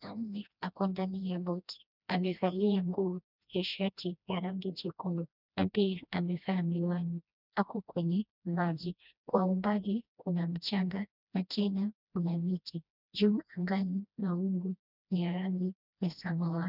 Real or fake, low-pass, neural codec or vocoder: fake; 5.4 kHz; codec, 16 kHz, 1 kbps, FreqCodec, smaller model